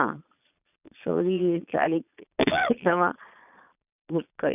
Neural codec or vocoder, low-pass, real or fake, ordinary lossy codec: vocoder, 22.05 kHz, 80 mel bands, Vocos; 3.6 kHz; fake; none